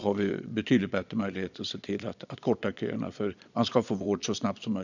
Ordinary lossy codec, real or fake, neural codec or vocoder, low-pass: none; fake; vocoder, 22.05 kHz, 80 mel bands, Vocos; 7.2 kHz